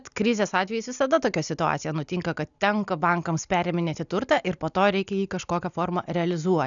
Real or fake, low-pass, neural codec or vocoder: real; 7.2 kHz; none